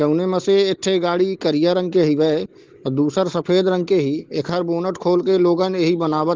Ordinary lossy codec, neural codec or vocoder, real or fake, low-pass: Opus, 16 kbps; none; real; 7.2 kHz